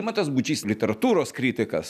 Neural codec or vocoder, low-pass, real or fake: none; 14.4 kHz; real